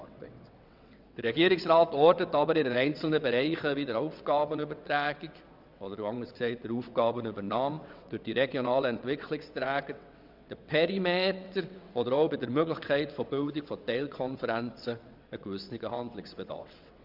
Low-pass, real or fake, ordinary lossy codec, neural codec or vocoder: 5.4 kHz; fake; none; vocoder, 22.05 kHz, 80 mel bands, WaveNeXt